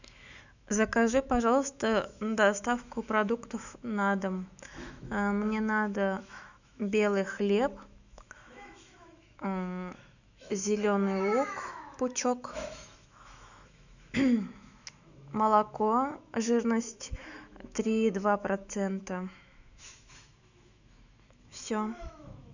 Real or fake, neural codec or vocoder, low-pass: fake; autoencoder, 48 kHz, 128 numbers a frame, DAC-VAE, trained on Japanese speech; 7.2 kHz